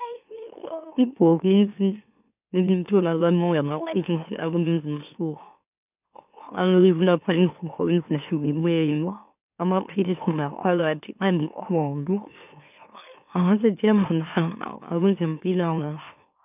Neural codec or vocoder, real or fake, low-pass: autoencoder, 44.1 kHz, a latent of 192 numbers a frame, MeloTTS; fake; 3.6 kHz